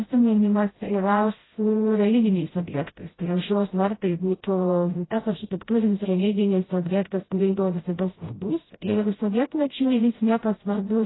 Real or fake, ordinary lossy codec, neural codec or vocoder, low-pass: fake; AAC, 16 kbps; codec, 16 kHz, 0.5 kbps, FreqCodec, smaller model; 7.2 kHz